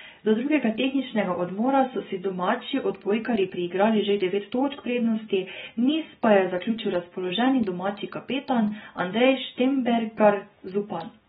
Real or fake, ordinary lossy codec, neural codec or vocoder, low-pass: real; AAC, 16 kbps; none; 19.8 kHz